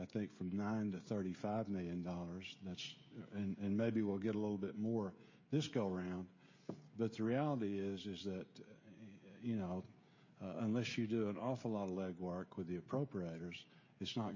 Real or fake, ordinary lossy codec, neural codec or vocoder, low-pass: fake; MP3, 32 kbps; codec, 16 kHz, 16 kbps, FreqCodec, smaller model; 7.2 kHz